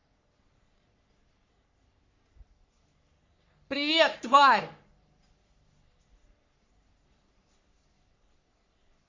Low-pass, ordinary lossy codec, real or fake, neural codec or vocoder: 7.2 kHz; MP3, 48 kbps; fake; codec, 44.1 kHz, 3.4 kbps, Pupu-Codec